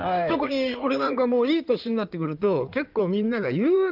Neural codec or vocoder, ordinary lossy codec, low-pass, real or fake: codec, 16 kHz in and 24 kHz out, 2.2 kbps, FireRedTTS-2 codec; Opus, 32 kbps; 5.4 kHz; fake